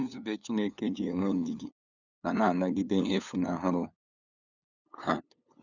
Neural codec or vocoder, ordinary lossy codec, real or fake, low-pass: codec, 16 kHz, 8 kbps, FunCodec, trained on LibriTTS, 25 frames a second; none; fake; 7.2 kHz